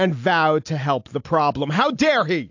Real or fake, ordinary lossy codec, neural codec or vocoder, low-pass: real; AAC, 48 kbps; none; 7.2 kHz